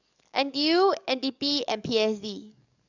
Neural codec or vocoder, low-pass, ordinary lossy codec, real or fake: codec, 44.1 kHz, 7.8 kbps, DAC; 7.2 kHz; none; fake